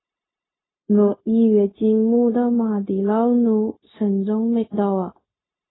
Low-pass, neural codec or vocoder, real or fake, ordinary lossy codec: 7.2 kHz; codec, 16 kHz, 0.4 kbps, LongCat-Audio-Codec; fake; AAC, 16 kbps